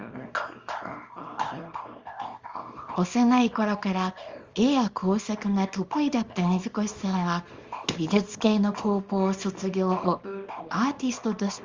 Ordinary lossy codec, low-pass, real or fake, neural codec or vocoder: Opus, 32 kbps; 7.2 kHz; fake; codec, 24 kHz, 0.9 kbps, WavTokenizer, small release